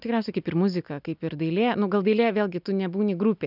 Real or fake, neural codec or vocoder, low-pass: real; none; 5.4 kHz